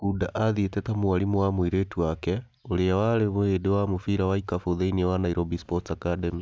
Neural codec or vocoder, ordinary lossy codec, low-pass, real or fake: none; none; none; real